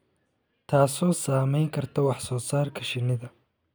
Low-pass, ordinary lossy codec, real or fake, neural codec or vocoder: none; none; real; none